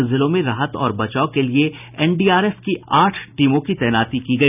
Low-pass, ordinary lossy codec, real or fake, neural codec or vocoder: 3.6 kHz; none; real; none